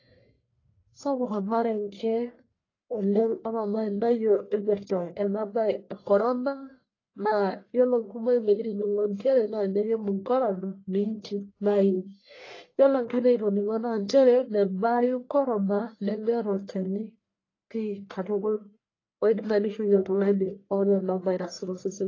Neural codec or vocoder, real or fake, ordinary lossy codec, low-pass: codec, 44.1 kHz, 1.7 kbps, Pupu-Codec; fake; AAC, 32 kbps; 7.2 kHz